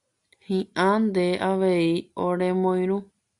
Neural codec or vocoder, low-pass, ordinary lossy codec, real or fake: none; 10.8 kHz; Opus, 64 kbps; real